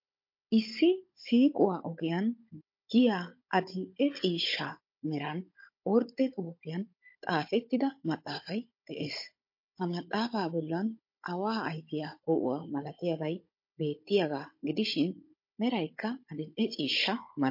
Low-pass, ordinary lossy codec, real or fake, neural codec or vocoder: 5.4 kHz; MP3, 32 kbps; fake; codec, 16 kHz, 16 kbps, FunCodec, trained on Chinese and English, 50 frames a second